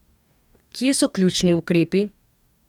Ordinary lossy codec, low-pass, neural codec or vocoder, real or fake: none; 19.8 kHz; codec, 44.1 kHz, 2.6 kbps, DAC; fake